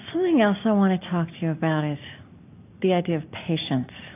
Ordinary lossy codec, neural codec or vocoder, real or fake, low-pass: AAC, 24 kbps; none; real; 3.6 kHz